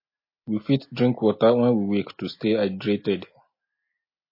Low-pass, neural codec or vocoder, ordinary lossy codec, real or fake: 5.4 kHz; none; MP3, 24 kbps; real